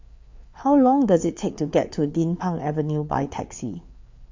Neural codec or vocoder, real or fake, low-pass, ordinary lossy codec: codec, 16 kHz, 4 kbps, FreqCodec, larger model; fake; 7.2 kHz; MP3, 48 kbps